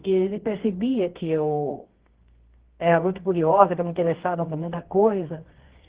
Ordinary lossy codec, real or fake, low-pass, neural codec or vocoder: Opus, 16 kbps; fake; 3.6 kHz; codec, 24 kHz, 0.9 kbps, WavTokenizer, medium music audio release